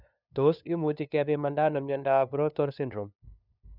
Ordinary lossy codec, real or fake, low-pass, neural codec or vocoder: none; fake; 5.4 kHz; codec, 16 kHz, 2 kbps, FunCodec, trained on LibriTTS, 25 frames a second